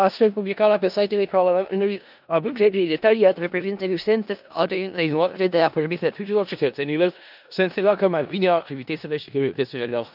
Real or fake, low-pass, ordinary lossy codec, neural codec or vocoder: fake; 5.4 kHz; none; codec, 16 kHz in and 24 kHz out, 0.4 kbps, LongCat-Audio-Codec, four codebook decoder